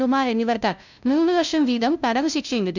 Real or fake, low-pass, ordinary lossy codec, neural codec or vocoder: fake; 7.2 kHz; none; codec, 16 kHz, 0.5 kbps, FunCodec, trained on LibriTTS, 25 frames a second